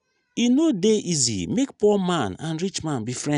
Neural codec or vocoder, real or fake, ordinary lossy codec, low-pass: none; real; none; 10.8 kHz